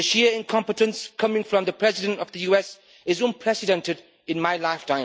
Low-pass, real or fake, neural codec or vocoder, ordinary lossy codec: none; real; none; none